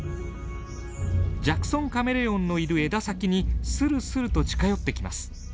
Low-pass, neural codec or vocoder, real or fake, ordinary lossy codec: none; none; real; none